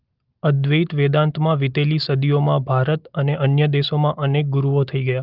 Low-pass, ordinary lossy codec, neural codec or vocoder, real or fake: 5.4 kHz; Opus, 32 kbps; none; real